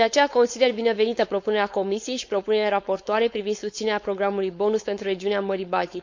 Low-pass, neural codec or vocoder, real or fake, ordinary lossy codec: 7.2 kHz; codec, 16 kHz, 4.8 kbps, FACodec; fake; MP3, 64 kbps